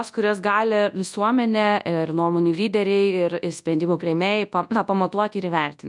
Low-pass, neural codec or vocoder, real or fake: 10.8 kHz; codec, 24 kHz, 0.9 kbps, WavTokenizer, large speech release; fake